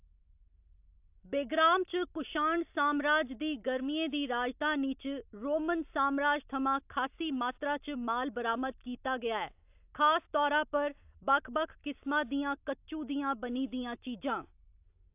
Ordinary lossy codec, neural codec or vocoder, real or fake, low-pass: AAC, 32 kbps; none; real; 3.6 kHz